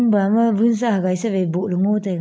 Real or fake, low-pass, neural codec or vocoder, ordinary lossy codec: real; none; none; none